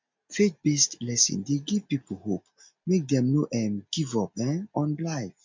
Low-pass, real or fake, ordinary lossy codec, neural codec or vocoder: 7.2 kHz; real; MP3, 64 kbps; none